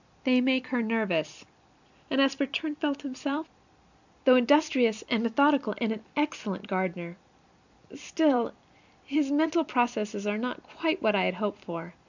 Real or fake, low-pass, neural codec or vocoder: fake; 7.2 kHz; vocoder, 44.1 kHz, 128 mel bands every 256 samples, BigVGAN v2